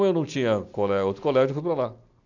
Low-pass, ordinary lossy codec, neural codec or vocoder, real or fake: 7.2 kHz; none; none; real